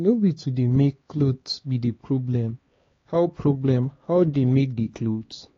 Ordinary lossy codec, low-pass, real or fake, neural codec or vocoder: AAC, 32 kbps; 7.2 kHz; fake; codec, 16 kHz, 2 kbps, X-Codec, HuBERT features, trained on LibriSpeech